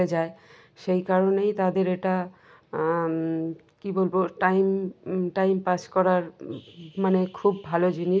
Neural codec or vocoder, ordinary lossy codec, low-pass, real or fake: none; none; none; real